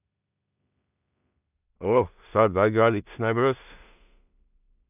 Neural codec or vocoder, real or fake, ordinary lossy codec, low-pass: codec, 16 kHz in and 24 kHz out, 0.4 kbps, LongCat-Audio-Codec, two codebook decoder; fake; none; 3.6 kHz